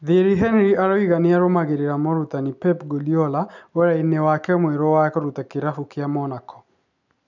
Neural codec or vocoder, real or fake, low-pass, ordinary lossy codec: none; real; 7.2 kHz; none